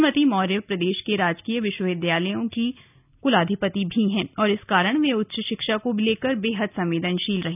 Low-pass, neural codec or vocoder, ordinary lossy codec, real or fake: 3.6 kHz; none; none; real